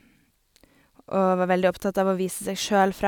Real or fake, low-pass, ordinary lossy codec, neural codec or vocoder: real; 19.8 kHz; none; none